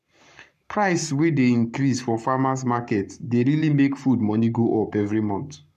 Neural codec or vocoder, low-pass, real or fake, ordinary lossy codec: codec, 44.1 kHz, 7.8 kbps, Pupu-Codec; 14.4 kHz; fake; MP3, 96 kbps